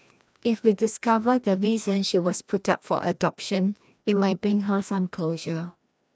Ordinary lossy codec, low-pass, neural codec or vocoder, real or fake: none; none; codec, 16 kHz, 1 kbps, FreqCodec, larger model; fake